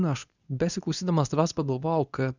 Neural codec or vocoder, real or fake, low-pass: codec, 24 kHz, 0.9 kbps, WavTokenizer, medium speech release version 2; fake; 7.2 kHz